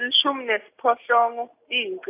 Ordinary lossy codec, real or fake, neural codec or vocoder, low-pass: AAC, 24 kbps; real; none; 3.6 kHz